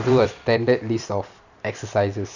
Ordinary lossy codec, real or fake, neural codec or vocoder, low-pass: none; fake; vocoder, 44.1 kHz, 128 mel bands every 256 samples, BigVGAN v2; 7.2 kHz